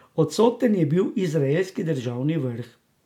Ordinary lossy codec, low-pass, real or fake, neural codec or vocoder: MP3, 96 kbps; 19.8 kHz; real; none